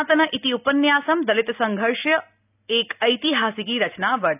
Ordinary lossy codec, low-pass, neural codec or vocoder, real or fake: none; 3.6 kHz; none; real